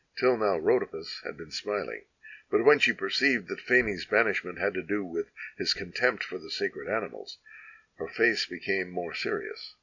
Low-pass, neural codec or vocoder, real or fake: 7.2 kHz; none; real